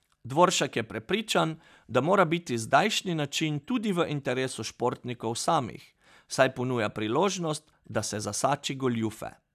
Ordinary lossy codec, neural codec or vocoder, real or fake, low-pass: none; none; real; 14.4 kHz